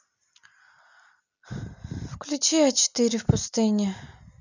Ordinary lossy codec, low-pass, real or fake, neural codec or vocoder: none; 7.2 kHz; real; none